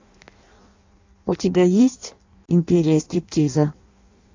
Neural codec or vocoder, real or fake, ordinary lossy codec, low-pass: codec, 16 kHz in and 24 kHz out, 0.6 kbps, FireRedTTS-2 codec; fake; AAC, 48 kbps; 7.2 kHz